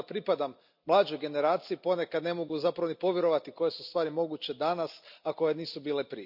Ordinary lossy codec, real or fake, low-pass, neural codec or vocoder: none; real; 5.4 kHz; none